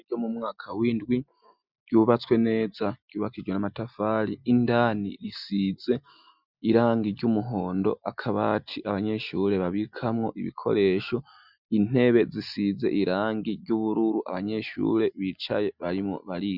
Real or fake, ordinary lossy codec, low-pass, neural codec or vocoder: real; Opus, 64 kbps; 5.4 kHz; none